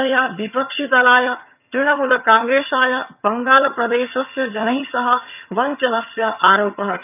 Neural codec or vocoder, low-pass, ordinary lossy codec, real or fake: vocoder, 22.05 kHz, 80 mel bands, HiFi-GAN; 3.6 kHz; none; fake